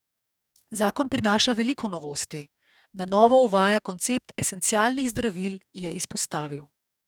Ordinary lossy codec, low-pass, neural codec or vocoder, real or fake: none; none; codec, 44.1 kHz, 2.6 kbps, DAC; fake